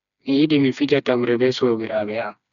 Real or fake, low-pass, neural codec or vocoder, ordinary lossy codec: fake; 7.2 kHz; codec, 16 kHz, 2 kbps, FreqCodec, smaller model; none